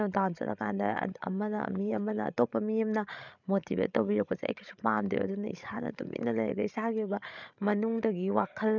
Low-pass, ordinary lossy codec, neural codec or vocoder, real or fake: 7.2 kHz; none; codec, 16 kHz, 16 kbps, FreqCodec, larger model; fake